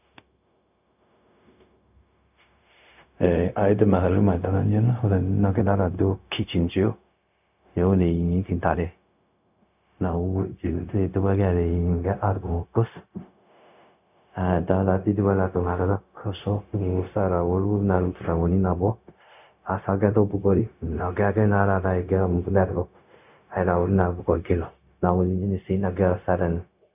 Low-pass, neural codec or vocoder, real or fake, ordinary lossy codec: 3.6 kHz; codec, 16 kHz, 0.4 kbps, LongCat-Audio-Codec; fake; none